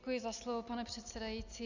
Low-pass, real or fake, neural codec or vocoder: 7.2 kHz; real; none